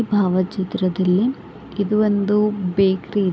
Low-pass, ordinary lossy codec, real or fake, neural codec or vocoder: none; none; real; none